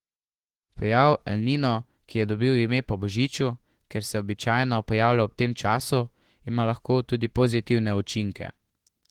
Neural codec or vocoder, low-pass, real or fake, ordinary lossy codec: autoencoder, 48 kHz, 32 numbers a frame, DAC-VAE, trained on Japanese speech; 19.8 kHz; fake; Opus, 16 kbps